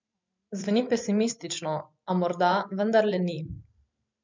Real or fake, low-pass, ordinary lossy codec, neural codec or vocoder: fake; 7.2 kHz; MP3, 64 kbps; vocoder, 44.1 kHz, 128 mel bands every 512 samples, BigVGAN v2